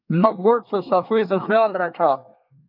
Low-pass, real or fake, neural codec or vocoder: 5.4 kHz; fake; codec, 24 kHz, 1 kbps, SNAC